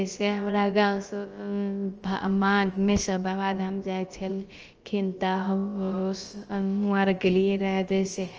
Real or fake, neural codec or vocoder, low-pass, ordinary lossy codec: fake; codec, 16 kHz, about 1 kbps, DyCAST, with the encoder's durations; 7.2 kHz; Opus, 24 kbps